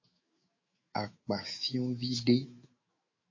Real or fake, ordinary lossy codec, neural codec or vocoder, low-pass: fake; MP3, 32 kbps; autoencoder, 48 kHz, 128 numbers a frame, DAC-VAE, trained on Japanese speech; 7.2 kHz